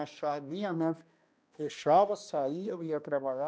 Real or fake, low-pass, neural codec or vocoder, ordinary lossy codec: fake; none; codec, 16 kHz, 1 kbps, X-Codec, HuBERT features, trained on balanced general audio; none